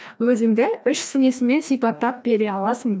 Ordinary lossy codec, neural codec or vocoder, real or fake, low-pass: none; codec, 16 kHz, 1 kbps, FreqCodec, larger model; fake; none